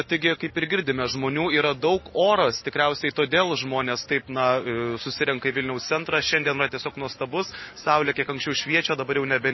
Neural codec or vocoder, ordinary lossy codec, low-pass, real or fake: none; MP3, 24 kbps; 7.2 kHz; real